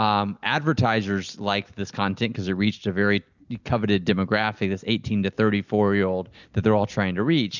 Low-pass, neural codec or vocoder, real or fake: 7.2 kHz; none; real